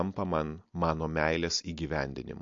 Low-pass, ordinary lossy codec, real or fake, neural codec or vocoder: 7.2 kHz; MP3, 48 kbps; real; none